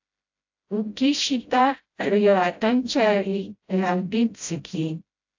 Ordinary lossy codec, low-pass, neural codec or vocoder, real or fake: AAC, 48 kbps; 7.2 kHz; codec, 16 kHz, 0.5 kbps, FreqCodec, smaller model; fake